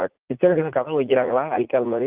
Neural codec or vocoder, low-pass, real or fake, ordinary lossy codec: vocoder, 44.1 kHz, 80 mel bands, Vocos; 3.6 kHz; fake; Opus, 16 kbps